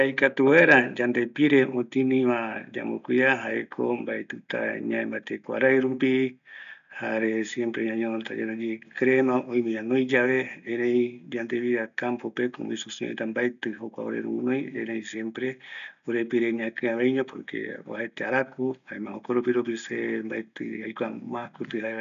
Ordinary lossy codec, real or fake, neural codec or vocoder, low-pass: none; real; none; 7.2 kHz